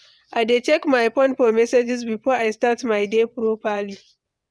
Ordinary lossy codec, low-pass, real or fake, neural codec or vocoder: none; none; fake; vocoder, 22.05 kHz, 80 mel bands, WaveNeXt